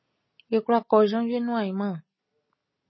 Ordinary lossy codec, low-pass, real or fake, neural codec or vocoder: MP3, 24 kbps; 7.2 kHz; real; none